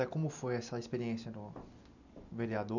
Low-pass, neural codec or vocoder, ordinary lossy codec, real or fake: 7.2 kHz; none; none; real